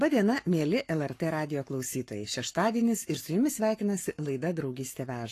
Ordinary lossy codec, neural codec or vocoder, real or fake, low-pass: AAC, 48 kbps; codec, 44.1 kHz, 7.8 kbps, Pupu-Codec; fake; 14.4 kHz